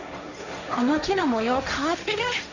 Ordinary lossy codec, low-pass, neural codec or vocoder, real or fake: none; 7.2 kHz; codec, 16 kHz, 1.1 kbps, Voila-Tokenizer; fake